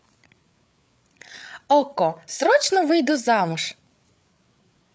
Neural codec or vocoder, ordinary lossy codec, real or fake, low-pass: codec, 16 kHz, 8 kbps, FreqCodec, larger model; none; fake; none